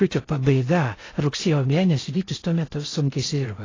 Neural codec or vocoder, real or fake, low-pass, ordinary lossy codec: codec, 16 kHz in and 24 kHz out, 0.6 kbps, FocalCodec, streaming, 4096 codes; fake; 7.2 kHz; AAC, 32 kbps